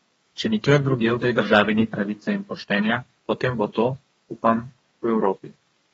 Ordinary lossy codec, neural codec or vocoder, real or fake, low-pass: AAC, 24 kbps; codec, 32 kHz, 1.9 kbps, SNAC; fake; 14.4 kHz